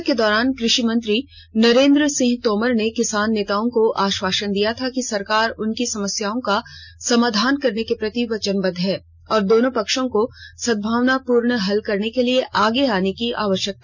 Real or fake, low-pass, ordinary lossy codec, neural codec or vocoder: real; none; none; none